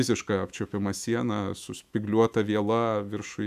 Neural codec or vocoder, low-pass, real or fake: autoencoder, 48 kHz, 128 numbers a frame, DAC-VAE, trained on Japanese speech; 14.4 kHz; fake